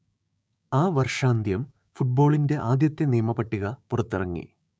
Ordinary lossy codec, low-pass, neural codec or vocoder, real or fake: none; none; codec, 16 kHz, 6 kbps, DAC; fake